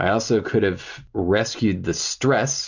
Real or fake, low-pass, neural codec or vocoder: real; 7.2 kHz; none